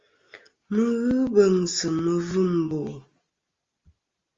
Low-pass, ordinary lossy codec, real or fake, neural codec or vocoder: 7.2 kHz; Opus, 32 kbps; real; none